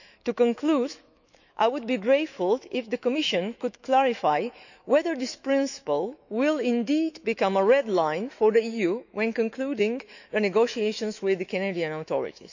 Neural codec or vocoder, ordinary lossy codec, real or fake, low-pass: autoencoder, 48 kHz, 128 numbers a frame, DAC-VAE, trained on Japanese speech; none; fake; 7.2 kHz